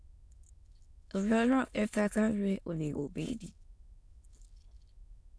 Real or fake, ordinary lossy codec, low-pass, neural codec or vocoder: fake; none; none; autoencoder, 22.05 kHz, a latent of 192 numbers a frame, VITS, trained on many speakers